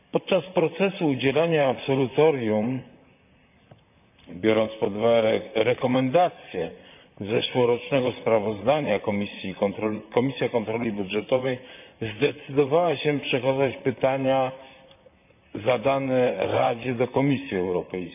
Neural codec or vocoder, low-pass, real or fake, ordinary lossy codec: vocoder, 44.1 kHz, 128 mel bands, Pupu-Vocoder; 3.6 kHz; fake; none